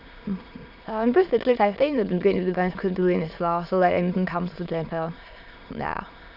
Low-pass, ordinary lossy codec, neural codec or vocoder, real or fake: 5.4 kHz; none; autoencoder, 22.05 kHz, a latent of 192 numbers a frame, VITS, trained on many speakers; fake